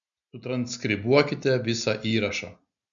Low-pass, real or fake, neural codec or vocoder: 7.2 kHz; real; none